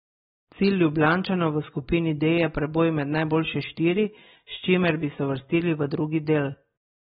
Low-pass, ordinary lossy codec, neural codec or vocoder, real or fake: 7.2 kHz; AAC, 16 kbps; none; real